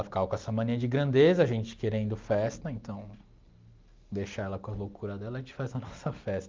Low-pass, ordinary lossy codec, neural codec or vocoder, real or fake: 7.2 kHz; Opus, 24 kbps; none; real